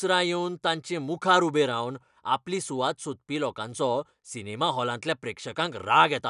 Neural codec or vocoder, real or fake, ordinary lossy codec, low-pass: none; real; none; 10.8 kHz